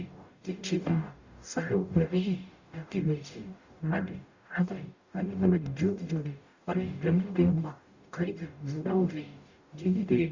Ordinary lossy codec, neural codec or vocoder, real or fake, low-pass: Opus, 64 kbps; codec, 44.1 kHz, 0.9 kbps, DAC; fake; 7.2 kHz